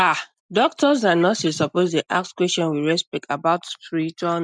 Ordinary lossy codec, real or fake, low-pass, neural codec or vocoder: none; real; 9.9 kHz; none